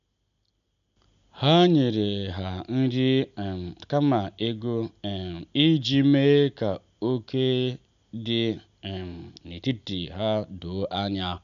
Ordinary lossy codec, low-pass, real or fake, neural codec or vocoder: none; 7.2 kHz; real; none